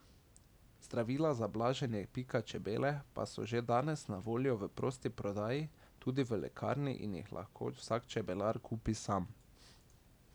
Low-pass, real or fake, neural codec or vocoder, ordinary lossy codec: none; real; none; none